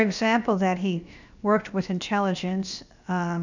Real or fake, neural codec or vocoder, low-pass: fake; codec, 16 kHz, 0.7 kbps, FocalCodec; 7.2 kHz